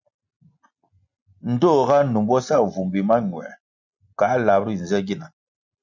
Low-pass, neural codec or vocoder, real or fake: 7.2 kHz; none; real